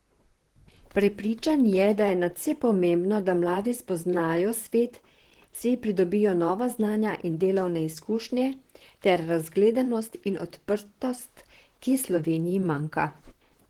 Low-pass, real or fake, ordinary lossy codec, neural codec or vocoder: 19.8 kHz; fake; Opus, 16 kbps; vocoder, 44.1 kHz, 128 mel bands, Pupu-Vocoder